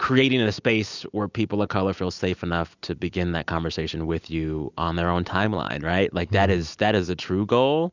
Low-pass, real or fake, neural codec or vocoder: 7.2 kHz; real; none